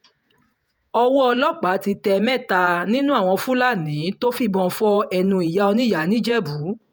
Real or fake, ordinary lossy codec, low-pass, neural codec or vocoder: fake; none; none; vocoder, 48 kHz, 128 mel bands, Vocos